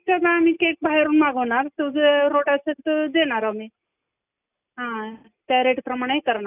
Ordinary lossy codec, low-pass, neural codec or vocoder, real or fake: none; 3.6 kHz; none; real